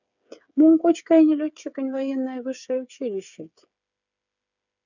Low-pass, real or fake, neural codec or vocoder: 7.2 kHz; fake; codec, 16 kHz, 8 kbps, FreqCodec, smaller model